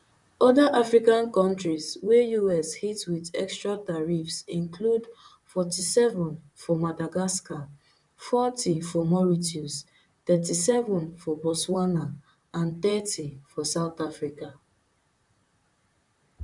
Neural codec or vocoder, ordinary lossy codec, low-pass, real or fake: vocoder, 44.1 kHz, 128 mel bands, Pupu-Vocoder; none; 10.8 kHz; fake